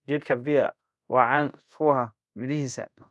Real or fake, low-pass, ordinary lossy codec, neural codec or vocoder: fake; none; none; codec, 24 kHz, 0.5 kbps, DualCodec